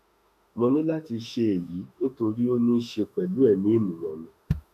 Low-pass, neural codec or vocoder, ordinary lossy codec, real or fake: 14.4 kHz; autoencoder, 48 kHz, 32 numbers a frame, DAC-VAE, trained on Japanese speech; none; fake